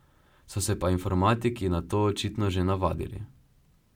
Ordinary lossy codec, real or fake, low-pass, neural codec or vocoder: MP3, 96 kbps; real; 19.8 kHz; none